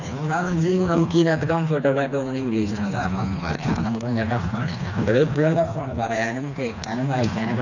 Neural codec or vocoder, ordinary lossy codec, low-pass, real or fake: codec, 16 kHz, 2 kbps, FreqCodec, smaller model; none; 7.2 kHz; fake